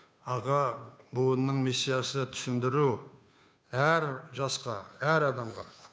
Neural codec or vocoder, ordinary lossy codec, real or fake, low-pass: codec, 16 kHz, 2 kbps, FunCodec, trained on Chinese and English, 25 frames a second; none; fake; none